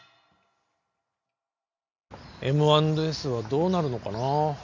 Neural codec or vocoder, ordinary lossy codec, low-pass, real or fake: none; none; 7.2 kHz; real